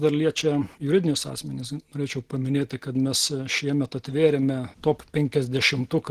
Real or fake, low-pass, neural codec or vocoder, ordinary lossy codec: real; 14.4 kHz; none; Opus, 16 kbps